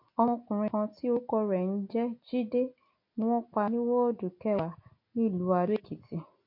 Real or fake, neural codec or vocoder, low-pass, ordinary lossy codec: real; none; 5.4 kHz; MP3, 32 kbps